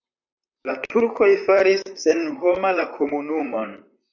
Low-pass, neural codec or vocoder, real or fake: 7.2 kHz; vocoder, 44.1 kHz, 128 mel bands, Pupu-Vocoder; fake